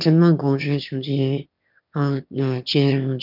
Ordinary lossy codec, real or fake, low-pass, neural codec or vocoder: none; fake; 5.4 kHz; autoencoder, 22.05 kHz, a latent of 192 numbers a frame, VITS, trained on one speaker